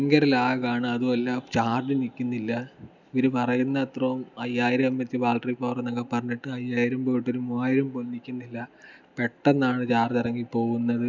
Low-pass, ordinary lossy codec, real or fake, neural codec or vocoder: 7.2 kHz; none; real; none